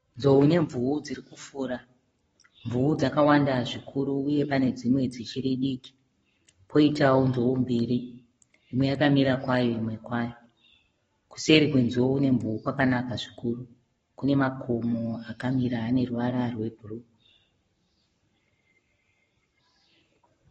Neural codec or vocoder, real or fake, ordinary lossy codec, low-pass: codec, 44.1 kHz, 7.8 kbps, Pupu-Codec; fake; AAC, 24 kbps; 19.8 kHz